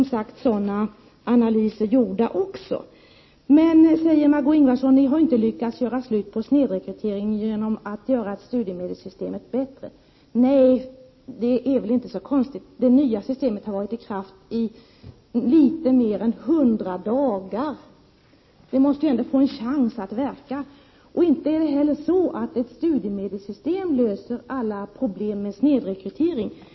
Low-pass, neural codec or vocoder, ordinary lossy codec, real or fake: 7.2 kHz; none; MP3, 24 kbps; real